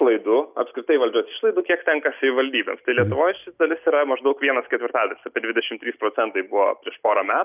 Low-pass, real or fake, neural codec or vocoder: 3.6 kHz; real; none